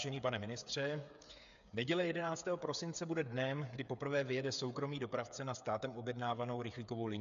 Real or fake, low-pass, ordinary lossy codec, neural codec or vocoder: fake; 7.2 kHz; AAC, 96 kbps; codec, 16 kHz, 8 kbps, FreqCodec, smaller model